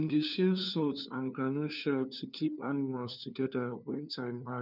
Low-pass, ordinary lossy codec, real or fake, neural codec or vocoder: 5.4 kHz; none; fake; codec, 16 kHz, 2 kbps, FunCodec, trained on LibriTTS, 25 frames a second